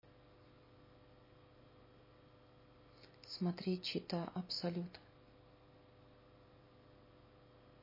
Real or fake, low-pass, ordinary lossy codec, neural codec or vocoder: real; 5.4 kHz; MP3, 24 kbps; none